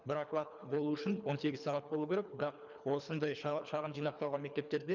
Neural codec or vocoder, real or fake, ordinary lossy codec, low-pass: codec, 24 kHz, 3 kbps, HILCodec; fake; none; 7.2 kHz